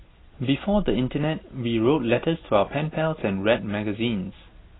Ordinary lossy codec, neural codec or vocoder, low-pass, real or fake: AAC, 16 kbps; none; 7.2 kHz; real